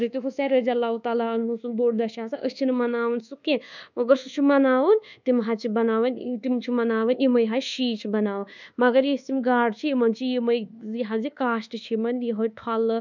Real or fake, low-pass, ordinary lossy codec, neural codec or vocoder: fake; 7.2 kHz; none; codec, 24 kHz, 1.2 kbps, DualCodec